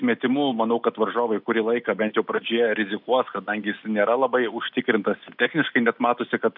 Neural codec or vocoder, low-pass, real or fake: none; 5.4 kHz; real